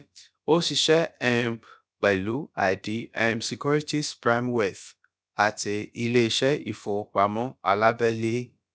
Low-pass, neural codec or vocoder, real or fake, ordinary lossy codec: none; codec, 16 kHz, about 1 kbps, DyCAST, with the encoder's durations; fake; none